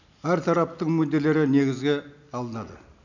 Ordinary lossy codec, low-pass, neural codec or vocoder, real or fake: none; 7.2 kHz; none; real